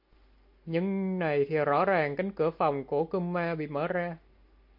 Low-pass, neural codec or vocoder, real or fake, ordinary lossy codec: 5.4 kHz; none; real; AAC, 48 kbps